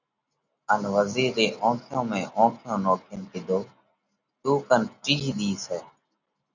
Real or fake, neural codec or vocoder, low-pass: real; none; 7.2 kHz